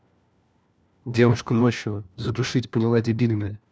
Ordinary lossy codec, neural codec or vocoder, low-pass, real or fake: none; codec, 16 kHz, 1 kbps, FunCodec, trained on LibriTTS, 50 frames a second; none; fake